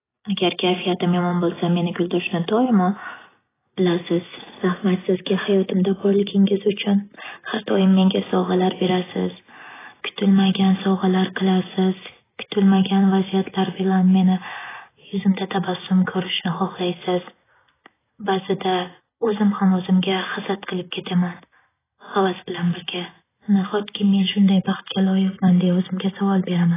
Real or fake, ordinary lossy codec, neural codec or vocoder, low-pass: real; AAC, 16 kbps; none; 3.6 kHz